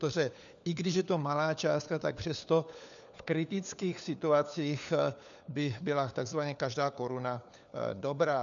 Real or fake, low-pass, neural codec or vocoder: fake; 7.2 kHz; codec, 16 kHz, 4 kbps, FunCodec, trained on LibriTTS, 50 frames a second